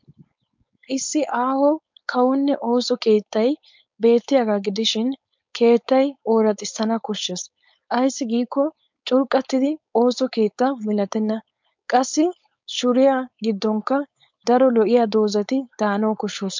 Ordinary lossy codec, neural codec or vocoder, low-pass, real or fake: MP3, 64 kbps; codec, 16 kHz, 4.8 kbps, FACodec; 7.2 kHz; fake